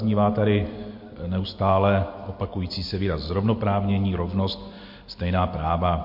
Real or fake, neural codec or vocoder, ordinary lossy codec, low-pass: real; none; MP3, 32 kbps; 5.4 kHz